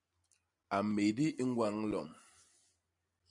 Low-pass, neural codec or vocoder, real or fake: 10.8 kHz; none; real